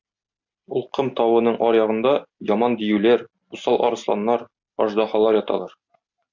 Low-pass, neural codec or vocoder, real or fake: 7.2 kHz; none; real